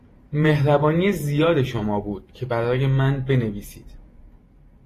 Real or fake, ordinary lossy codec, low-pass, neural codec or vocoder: fake; AAC, 48 kbps; 14.4 kHz; vocoder, 48 kHz, 128 mel bands, Vocos